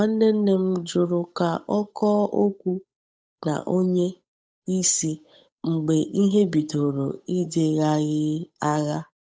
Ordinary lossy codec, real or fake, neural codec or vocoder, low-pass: none; fake; codec, 16 kHz, 8 kbps, FunCodec, trained on Chinese and English, 25 frames a second; none